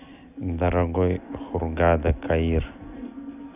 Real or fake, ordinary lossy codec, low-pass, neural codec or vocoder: real; none; 3.6 kHz; none